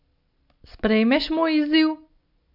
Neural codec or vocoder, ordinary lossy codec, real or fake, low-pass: none; none; real; 5.4 kHz